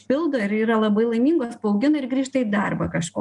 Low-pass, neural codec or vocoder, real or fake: 10.8 kHz; none; real